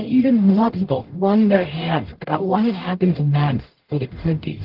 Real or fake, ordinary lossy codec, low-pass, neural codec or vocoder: fake; Opus, 16 kbps; 5.4 kHz; codec, 44.1 kHz, 0.9 kbps, DAC